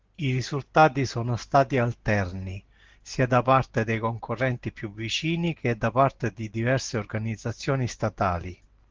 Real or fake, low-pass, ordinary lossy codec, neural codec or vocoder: real; 7.2 kHz; Opus, 16 kbps; none